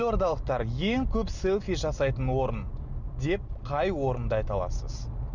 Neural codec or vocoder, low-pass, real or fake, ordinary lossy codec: none; 7.2 kHz; real; none